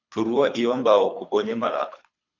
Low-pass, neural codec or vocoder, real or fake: 7.2 kHz; codec, 24 kHz, 3 kbps, HILCodec; fake